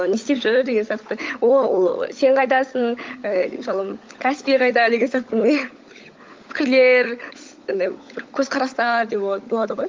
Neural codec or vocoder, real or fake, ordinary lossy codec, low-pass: codec, 16 kHz, 16 kbps, FunCodec, trained on LibriTTS, 50 frames a second; fake; Opus, 24 kbps; 7.2 kHz